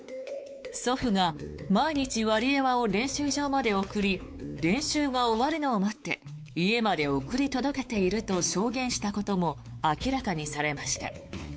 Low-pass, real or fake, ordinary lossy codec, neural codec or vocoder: none; fake; none; codec, 16 kHz, 4 kbps, X-Codec, WavLM features, trained on Multilingual LibriSpeech